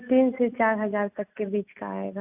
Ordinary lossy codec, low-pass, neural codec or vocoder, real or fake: AAC, 32 kbps; 3.6 kHz; none; real